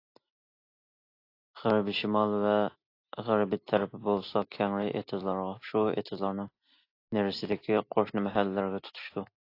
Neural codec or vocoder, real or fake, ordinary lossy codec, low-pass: none; real; AAC, 32 kbps; 5.4 kHz